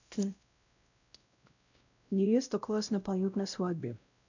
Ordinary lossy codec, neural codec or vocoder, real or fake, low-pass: none; codec, 16 kHz, 0.5 kbps, X-Codec, WavLM features, trained on Multilingual LibriSpeech; fake; 7.2 kHz